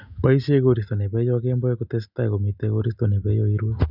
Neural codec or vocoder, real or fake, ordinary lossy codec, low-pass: none; real; none; 5.4 kHz